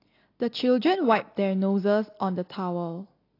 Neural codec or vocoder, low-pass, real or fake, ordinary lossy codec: none; 5.4 kHz; real; AAC, 32 kbps